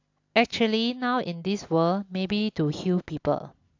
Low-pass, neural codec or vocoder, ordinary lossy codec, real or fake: 7.2 kHz; none; AAC, 48 kbps; real